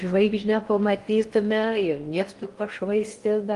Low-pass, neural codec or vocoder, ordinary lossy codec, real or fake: 10.8 kHz; codec, 16 kHz in and 24 kHz out, 0.8 kbps, FocalCodec, streaming, 65536 codes; Opus, 24 kbps; fake